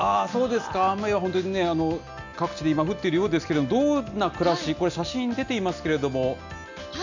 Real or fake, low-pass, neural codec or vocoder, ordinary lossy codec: real; 7.2 kHz; none; none